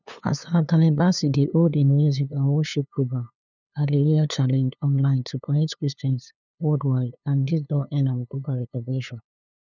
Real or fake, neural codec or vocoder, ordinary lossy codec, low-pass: fake; codec, 16 kHz, 2 kbps, FunCodec, trained on LibriTTS, 25 frames a second; none; 7.2 kHz